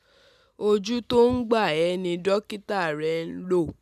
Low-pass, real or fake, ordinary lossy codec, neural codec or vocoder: 14.4 kHz; real; none; none